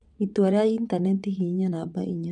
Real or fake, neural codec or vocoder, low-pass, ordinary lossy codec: fake; vocoder, 22.05 kHz, 80 mel bands, WaveNeXt; 9.9 kHz; none